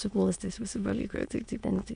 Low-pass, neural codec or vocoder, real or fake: 9.9 kHz; autoencoder, 22.05 kHz, a latent of 192 numbers a frame, VITS, trained on many speakers; fake